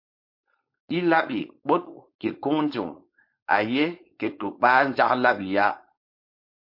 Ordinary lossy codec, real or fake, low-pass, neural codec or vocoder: MP3, 32 kbps; fake; 5.4 kHz; codec, 16 kHz, 4.8 kbps, FACodec